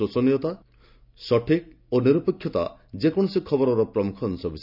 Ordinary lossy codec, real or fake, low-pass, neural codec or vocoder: none; real; 5.4 kHz; none